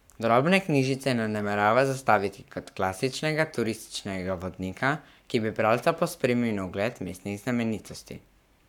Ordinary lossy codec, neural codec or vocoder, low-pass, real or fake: none; codec, 44.1 kHz, 7.8 kbps, Pupu-Codec; 19.8 kHz; fake